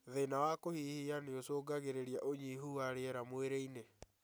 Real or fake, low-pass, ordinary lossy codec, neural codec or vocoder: real; none; none; none